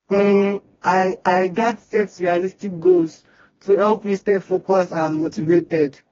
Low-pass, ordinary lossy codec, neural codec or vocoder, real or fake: 7.2 kHz; AAC, 24 kbps; codec, 16 kHz, 1 kbps, FreqCodec, smaller model; fake